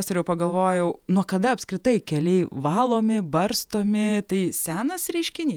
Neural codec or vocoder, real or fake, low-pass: vocoder, 48 kHz, 128 mel bands, Vocos; fake; 19.8 kHz